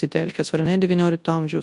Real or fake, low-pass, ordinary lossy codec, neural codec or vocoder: fake; 10.8 kHz; MP3, 64 kbps; codec, 24 kHz, 0.9 kbps, WavTokenizer, large speech release